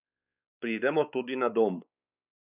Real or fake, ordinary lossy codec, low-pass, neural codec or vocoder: fake; none; 3.6 kHz; codec, 16 kHz, 4 kbps, X-Codec, WavLM features, trained on Multilingual LibriSpeech